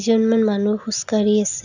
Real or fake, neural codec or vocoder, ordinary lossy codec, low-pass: real; none; none; 7.2 kHz